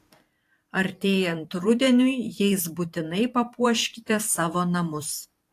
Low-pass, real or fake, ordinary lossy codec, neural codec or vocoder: 14.4 kHz; fake; AAC, 64 kbps; vocoder, 44.1 kHz, 128 mel bands every 512 samples, BigVGAN v2